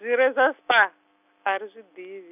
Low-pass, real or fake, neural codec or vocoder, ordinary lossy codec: 3.6 kHz; real; none; none